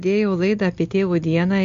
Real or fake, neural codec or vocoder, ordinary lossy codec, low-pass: real; none; MP3, 48 kbps; 7.2 kHz